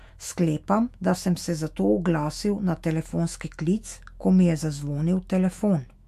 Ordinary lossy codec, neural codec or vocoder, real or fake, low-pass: MP3, 64 kbps; autoencoder, 48 kHz, 128 numbers a frame, DAC-VAE, trained on Japanese speech; fake; 14.4 kHz